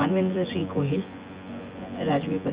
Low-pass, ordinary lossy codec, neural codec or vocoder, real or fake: 3.6 kHz; Opus, 24 kbps; vocoder, 24 kHz, 100 mel bands, Vocos; fake